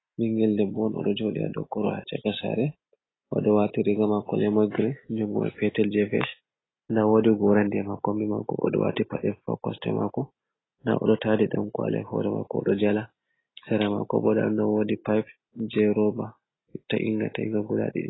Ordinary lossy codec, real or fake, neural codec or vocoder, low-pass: AAC, 16 kbps; real; none; 7.2 kHz